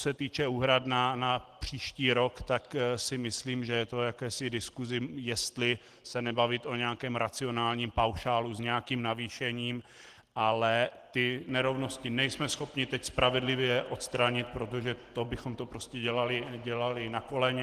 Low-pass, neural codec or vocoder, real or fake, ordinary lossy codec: 14.4 kHz; none; real; Opus, 16 kbps